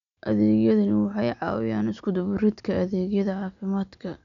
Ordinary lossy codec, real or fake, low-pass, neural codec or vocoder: none; real; 7.2 kHz; none